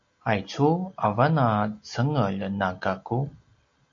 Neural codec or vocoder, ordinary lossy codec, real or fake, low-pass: none; AAC, 48 kbps; real; 7.2 kHz